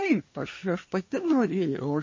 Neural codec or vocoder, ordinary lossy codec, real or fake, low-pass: codec, 24 kHz, 1 kbps, SNAC; MP3, 32 kbps; fake; 7.2 kHz